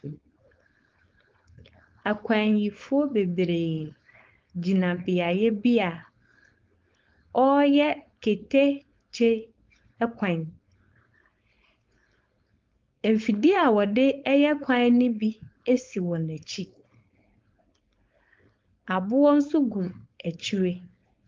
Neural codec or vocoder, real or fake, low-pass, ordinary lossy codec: codec, 16 kHz, 4.8 kbps, FACodec; fake; 7.2 kHz; Opus, 32 kbps